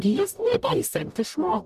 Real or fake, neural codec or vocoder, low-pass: fake; codec, 44.1 kHz, 0.9 kbps, DAC; 14.4 kHz